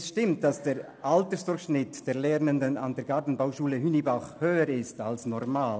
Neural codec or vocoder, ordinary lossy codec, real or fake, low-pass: none; none; real; none